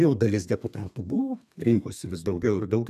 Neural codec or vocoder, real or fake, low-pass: codec, 32 kHz, 1.9 kbps, SNAC; fake; 14.4 kHz